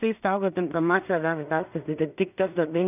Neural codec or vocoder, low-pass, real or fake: codec, 16 kHz in and 24 kHz out, 0.4 kbps, LongCat-Audio-Codec, two codebook decoder; 3.6 kHz; fake